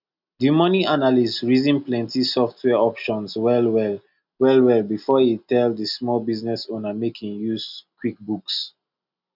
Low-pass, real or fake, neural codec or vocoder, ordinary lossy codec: 5.4 kHz; real; none; none